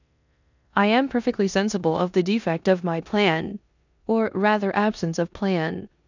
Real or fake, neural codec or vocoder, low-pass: fake; codec, 16 kHz in and 24 kHz out, 0.9 kbps, LongCat-Audio-Codec, four codebook decoder; 7.2 kHz